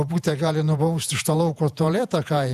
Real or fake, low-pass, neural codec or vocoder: fake; 14.4 kHz; vocoder, 48 kHz, 128 mel bands, Vocos